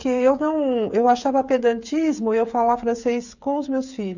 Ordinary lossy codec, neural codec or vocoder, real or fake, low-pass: none; codec, 16 kHz, 8 kbps, FreqCodec, smaller model; fake; 7.2 kHz